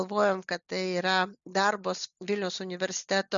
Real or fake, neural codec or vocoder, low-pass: real; none; 7.2 kHz